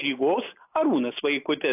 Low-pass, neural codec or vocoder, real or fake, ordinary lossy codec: 3.6 kHz; none; real; AAC, 32 kbps